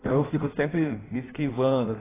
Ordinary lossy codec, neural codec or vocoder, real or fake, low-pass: AAC, 16 kbps; codec, 16 kHz in and 24 kHz out, 1.1 kbps, FireRedTTS-2 codec; fake; 3.6 kHz